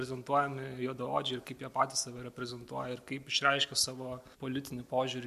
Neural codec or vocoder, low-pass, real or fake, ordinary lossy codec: none; 14.4 kHz; real; MP3, 64 kbps